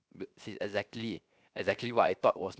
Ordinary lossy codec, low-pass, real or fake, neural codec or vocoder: none; none; fake; codec, 16 kHz, 0.7 kbps, FocalCodec